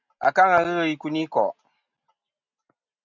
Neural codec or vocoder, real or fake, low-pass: none; real; 7.2 kHz